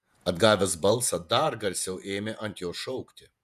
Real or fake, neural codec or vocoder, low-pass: fake; vocoder, 48 kHz, 128 mel bands, Vocos; 14.4 kHz